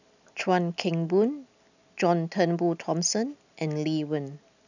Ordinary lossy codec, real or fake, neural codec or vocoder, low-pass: none; real; none; 7.2 kHz